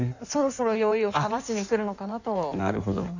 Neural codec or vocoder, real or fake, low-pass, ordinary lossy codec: codec, 16 kHz in and 24 kHz out, 1.1 kbps, FireRedTTS-2 codec; fake; 7.2 kHz; none